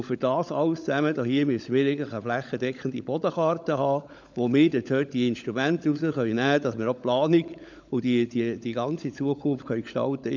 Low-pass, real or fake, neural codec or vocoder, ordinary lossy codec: 7.2 kHz; fake; codec, 16 kHz, 16 kbps, FunCodec, trained on LibriTTS, 50 frames a second; none